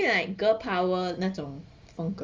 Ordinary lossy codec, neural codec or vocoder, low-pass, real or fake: Opus, 32 kbps; none; 7.2 kHz; real